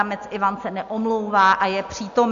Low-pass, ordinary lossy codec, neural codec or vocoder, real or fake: 7.2 kHz; AAC, 64 kbps; none; real